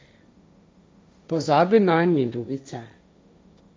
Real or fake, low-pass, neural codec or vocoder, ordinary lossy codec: fake; none; codec, 16 kHz, 1.1 kbps, Voila-Tokenizer; none